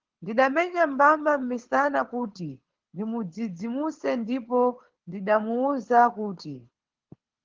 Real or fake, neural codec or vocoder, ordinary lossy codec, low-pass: fake; codec, 24 kHz, 6 kbps, HILCodec; Opus, 16 kbps; 7.2 kHz